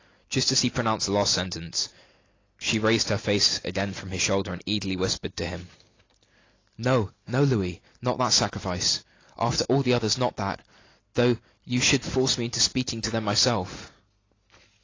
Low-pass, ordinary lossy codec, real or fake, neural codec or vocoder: 7.2 kHz; AAC, 32 kbps; real; none